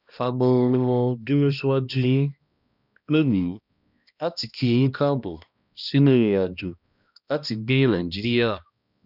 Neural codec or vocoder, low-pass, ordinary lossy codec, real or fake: codec, 16 kHz, 1 kbps, X-Codec, HuBERT features, trained on balanced general audio; 5.4 kHz; none; fake